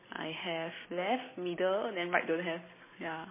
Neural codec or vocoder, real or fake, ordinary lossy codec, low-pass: none; real; MP3, 16 kbps; 3.6 kHz